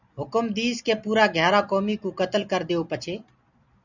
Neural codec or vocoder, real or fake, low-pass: none; real; 7.2 kHz